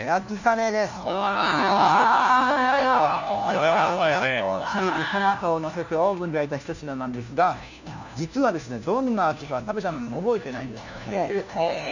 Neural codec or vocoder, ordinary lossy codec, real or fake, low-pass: codec, 16 kHz, 1 kbps, FunCodec, trained on LibriTTS, 50 frames a second; none; fake; 7.2 kHz